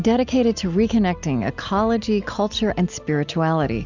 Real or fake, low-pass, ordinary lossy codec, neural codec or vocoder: real; 7.2 kHz; Opus, 64 kbps; none